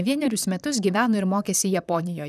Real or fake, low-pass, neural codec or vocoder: fake; 14.4 kHz; vocoder, 44.1 kHz, 128 mel bands, Pupu-Vocoder